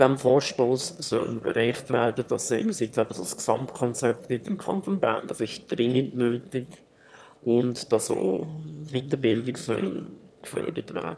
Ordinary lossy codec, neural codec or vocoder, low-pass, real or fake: none; autoencoder, 22.05 kHz, a latent of 192 numbers a frame, VITS, trained on one speaker; none; fake